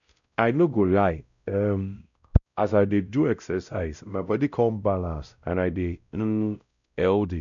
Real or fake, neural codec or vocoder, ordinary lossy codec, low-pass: fake; codec, 16 kHz, 0.5 kbps, X-Codec, WavLM features, trained on Multilingual LibriSpeech; none; 7.2 kHz